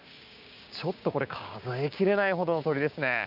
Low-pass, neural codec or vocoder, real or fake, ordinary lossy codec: 5.4 kHz; codec, 16 kHz, 6 kbps, DAC; fake; none